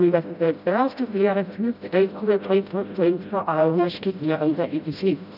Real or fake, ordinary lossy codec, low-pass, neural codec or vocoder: fake; none; 5.4 kHz; codec, 16 kHz, 0.5 kbps, FreqCodec, smaller model